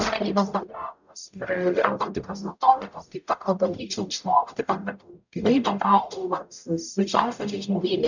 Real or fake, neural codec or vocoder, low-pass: fake; codec, 44.1 kHz, 0.9 kbps, DAC; 7.2 kHz